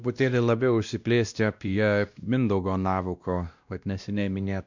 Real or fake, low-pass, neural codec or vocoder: fake; 7.2 kHz; codec, 16 kHz, 1 kbps, X-Codec, WavLM features, trained on Multilingual LibriSpeech